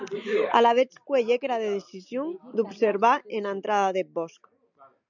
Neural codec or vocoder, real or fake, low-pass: none; real; 7.2 kHz